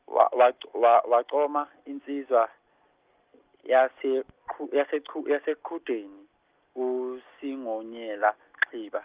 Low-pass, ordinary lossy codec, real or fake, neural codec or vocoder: 3.6 kHz; Opus, 32 kbps; real; none